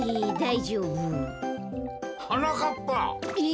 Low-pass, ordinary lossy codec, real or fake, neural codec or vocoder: none; none; real; none